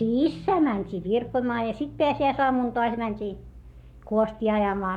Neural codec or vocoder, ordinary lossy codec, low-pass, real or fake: codec, 44.1 kHz, 7.8 kbps, Pupu-Codec; none; 19.8 kHz; fake